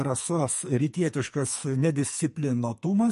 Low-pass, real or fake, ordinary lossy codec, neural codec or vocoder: 14.4 kHz; fake; MP3, 48 kbps; codec, 44.1 kHz, 2.6 kbps, SNAC